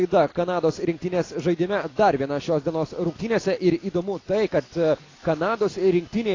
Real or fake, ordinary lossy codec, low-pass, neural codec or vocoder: real; AAC, 32 kbps; 7.2 kHz; none